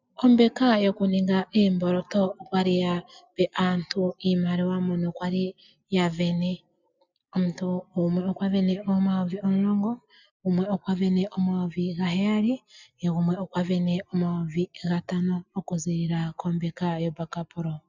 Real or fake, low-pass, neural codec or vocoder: real; 7.2 kHz; none